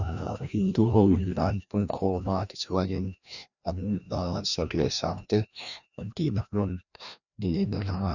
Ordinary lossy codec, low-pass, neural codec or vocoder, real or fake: none; 7.2 kHz; codec, 16 kHz, 1 kbps, FreqCodec, larger model; fake